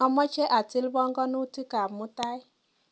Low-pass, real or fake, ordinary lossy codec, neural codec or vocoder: none; real; none; none